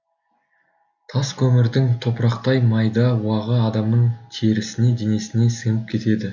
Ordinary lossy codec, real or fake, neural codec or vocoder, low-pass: none; real; none; 7.2 kHz